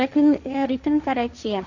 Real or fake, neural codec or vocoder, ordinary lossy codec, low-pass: fake; codec, 16 kHz, 1.1 kbps, Voila-Tokenizer; none; 7.2 kHz